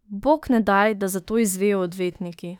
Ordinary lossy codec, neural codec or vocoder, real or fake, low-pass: none; autoencoder, 48 kHz, 32 numbers a frame, DAC-VAE, trained on Japanese speech; fake; 19.8 kHz